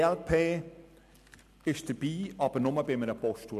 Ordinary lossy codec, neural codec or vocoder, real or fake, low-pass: MP3, 96 kbps; none; real; 14.4 kHz